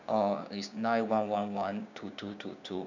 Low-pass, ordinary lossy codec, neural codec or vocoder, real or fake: 7.2 kHz; none; codec, 16 kHz, 6 kbps, DAC; fake